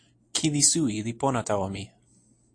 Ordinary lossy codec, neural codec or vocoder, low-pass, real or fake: AAC, 48 kbps; none; 9.9 kHz; real